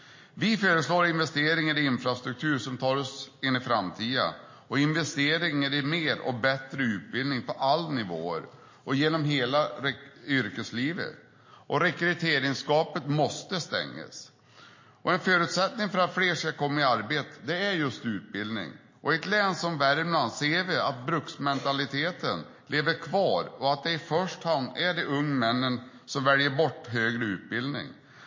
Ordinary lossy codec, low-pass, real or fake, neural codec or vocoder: MP3, 32 kbps; 7.2 kHz; real; none